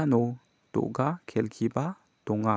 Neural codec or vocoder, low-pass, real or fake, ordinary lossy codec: none; none; real; none